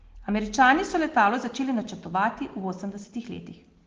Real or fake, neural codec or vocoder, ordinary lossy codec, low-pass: real; none; Opus, 16 kbps; 7.2 kHz